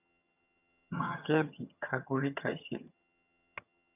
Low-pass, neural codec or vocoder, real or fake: 3.6 kHz; vocoder, 22.05 kHz, 80 mel bands, HiFi-GAN; fake